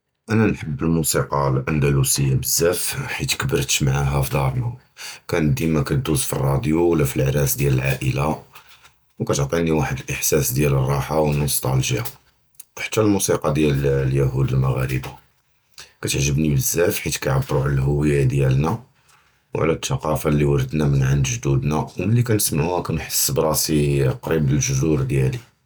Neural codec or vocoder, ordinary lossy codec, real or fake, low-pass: none; none; real; none